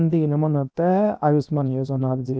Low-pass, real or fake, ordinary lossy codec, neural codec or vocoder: none; fake; none; codec, 16 kHz, 0.7 kbps, FocalCodec